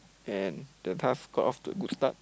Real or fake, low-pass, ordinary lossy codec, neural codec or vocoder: real; none; none; none